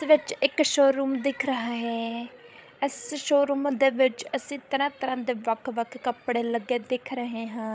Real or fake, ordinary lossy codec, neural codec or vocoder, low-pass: fake; none; codec, 16 kHz, 16 kbps, FreqCodec, larger model; none